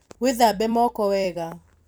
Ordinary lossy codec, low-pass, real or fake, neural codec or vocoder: none; none; fake; vocoder, 44.1 kHz, 128 mel bands every 256 samples, BigVGAN v2